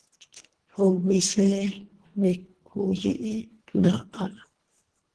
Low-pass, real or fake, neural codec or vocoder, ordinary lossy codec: 10.8 kHz; fake; codec, 24 kHz, 1.5 kbps, HILCodec; Opus, 16 kbps